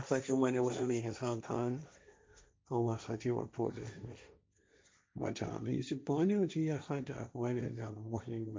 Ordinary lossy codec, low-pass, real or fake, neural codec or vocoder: none; none; fake; codec, 16 kHz, 1.1 kbps, Voila-Tokenizer